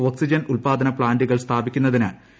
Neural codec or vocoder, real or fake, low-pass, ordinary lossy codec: none; real; none; none